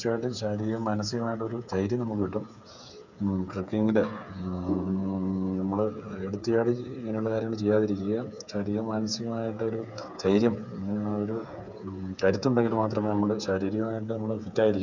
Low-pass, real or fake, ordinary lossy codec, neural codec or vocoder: 7.2 kHz; fake; none; codec, 16 kHz, 8 kbps, FreqCodec, smaller model